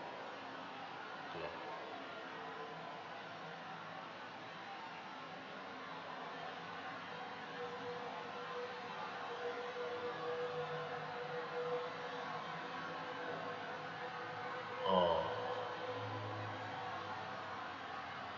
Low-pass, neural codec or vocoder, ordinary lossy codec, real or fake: 7.2 kHz; none; Opus, 64 kbps; real